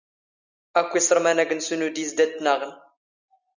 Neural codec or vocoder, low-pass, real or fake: none; 7.2 kHz; real